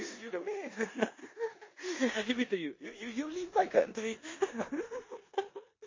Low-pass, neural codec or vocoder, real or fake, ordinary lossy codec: 7.2 kHz; codec, 16 kHz in and 24 kHz out, 0.9 kbps, LongCat-Audio-Codec, four codebook decoder; fake; MP3, 32 kbps